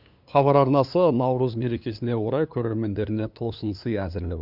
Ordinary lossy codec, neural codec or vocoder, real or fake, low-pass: none; codec, 16 kHz, 2 kbps, FunCodec, trained on LibriTTS, 25 frames a second; fake; 5.4 kHz